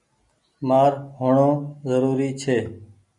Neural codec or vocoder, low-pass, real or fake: none; 10.8 kHz; real